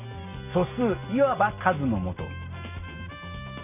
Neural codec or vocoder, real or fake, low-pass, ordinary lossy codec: none; real; 3.6 kHz; none